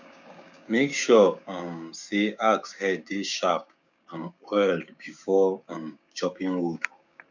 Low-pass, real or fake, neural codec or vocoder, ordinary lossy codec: 7.2 kHz; fake; codec, 16 kHz, 6 kbps, DAC; none